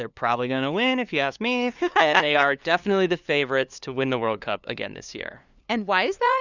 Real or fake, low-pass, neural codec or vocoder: fake; 7.2 kHz; codec, 16 kHz, 2 kbps, FunCodec, trained on LibriTTS, 25 frames a second